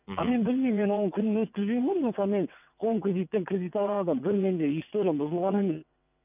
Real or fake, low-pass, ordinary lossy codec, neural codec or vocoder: fake; 3.6 kHz; none; vocoder, 22.05 kHz, 80 mel bands, WaveNeXt